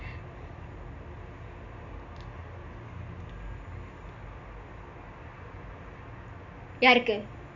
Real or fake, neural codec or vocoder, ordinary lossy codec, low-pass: real; none; none; 7.2 kHz